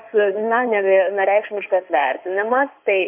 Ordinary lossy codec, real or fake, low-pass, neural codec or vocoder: MP3, 32 kbps; fake; 3.6 kHz; codec, 16 kHz in and 24 kHz out, 2.2 kbps, FireRedTTS-2 codec